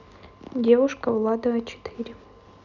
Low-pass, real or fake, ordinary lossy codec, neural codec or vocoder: 7.2 kHz; real; none; none